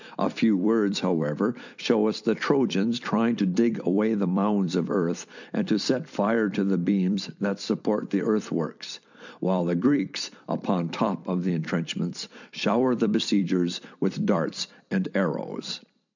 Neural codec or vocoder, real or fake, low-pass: vocoder, 44.1 kHz, 128 mel bands every 256 samples, BigVGAN v2; fake; 7.2 kHz